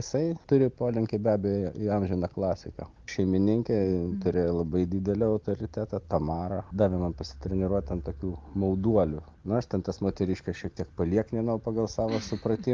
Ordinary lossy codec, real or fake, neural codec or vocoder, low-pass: Opus, 32 kbps; real; none; 7.2 kHz